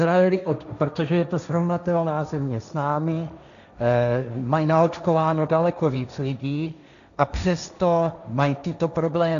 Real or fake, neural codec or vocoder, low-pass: fake; codec, 16 kHz, 1.1 kbps, Voila-Tokenizer; 7.2 kHz